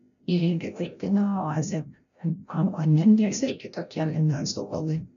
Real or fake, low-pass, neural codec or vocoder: fake; 7.2 kHz; codec, 16 kHz, 0.5 kbps, FreqCodec, larger model